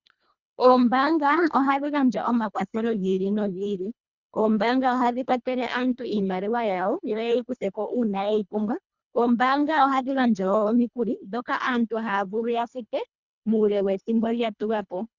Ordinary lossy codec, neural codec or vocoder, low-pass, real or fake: Opus, 64 kbps; codec, 24 kHz, 1.5 kbps, HILCodec; 7.2 kHz; fake